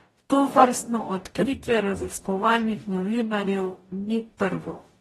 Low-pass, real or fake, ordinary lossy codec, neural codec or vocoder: 19.8 kHz; fake; AAC, 32 kbps; codec, 44.1 kHz, 0.9 kbps, DAC